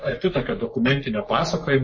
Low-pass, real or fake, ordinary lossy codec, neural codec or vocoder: 7.2 kHz; fake; MP3, 32 kbps; codec, 44.1 kHz, 3.4 kbps, Pupu-Codec